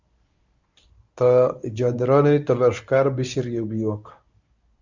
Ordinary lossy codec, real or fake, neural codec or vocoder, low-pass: Opus, 64 kbps; fake; codec, 24 kHz, 0.9 kbps, WavTokenizer, medium speech release version 1; 7.2 kHz